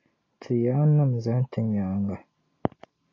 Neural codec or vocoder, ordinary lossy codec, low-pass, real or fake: none; AAC, 32 kbps; 7.2 kHz; real